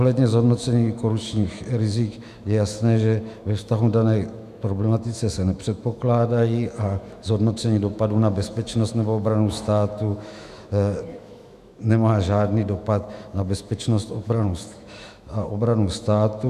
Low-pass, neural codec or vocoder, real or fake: 14.4 kHz; autoencoder, 48 kHz, 128 numbers a frame, DAC-VAE, trained on Japanese speech; fake